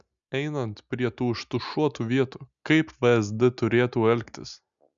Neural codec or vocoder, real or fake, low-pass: none; real; 7.2 kHz